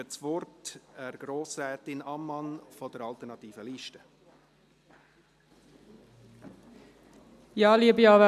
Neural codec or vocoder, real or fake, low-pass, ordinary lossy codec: none; real; 14.4 kHz; MP3, 96 kbps